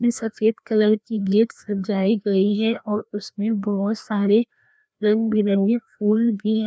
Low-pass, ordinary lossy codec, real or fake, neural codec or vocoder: none; none; fake; codec, 16 kHz, 1 kbps, FreqCodec, larger model